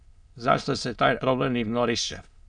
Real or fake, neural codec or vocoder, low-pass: fake; autoencoder, 22.05 kHz, a latent of 192 numbers a frame, VITS, trained on many speakers; 9.9 kHz